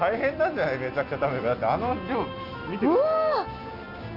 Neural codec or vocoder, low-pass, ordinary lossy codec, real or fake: none; 5.4 kHz; Opus, 64 kbps; real